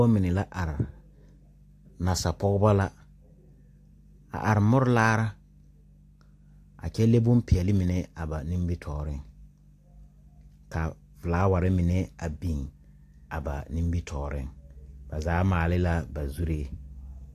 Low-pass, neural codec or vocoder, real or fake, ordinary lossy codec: 14.4 kHz; none; real; AAC, 64 kbps